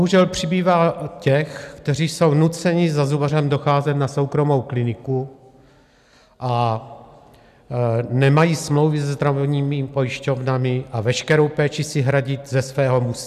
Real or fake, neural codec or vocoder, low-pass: real; none; 14.4 kHz